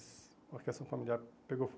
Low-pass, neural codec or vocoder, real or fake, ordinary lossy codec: none; none; real; none